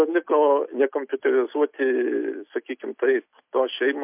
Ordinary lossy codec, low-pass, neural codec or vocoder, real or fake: MP3, 32 kbps; 3.6 kHz; none; real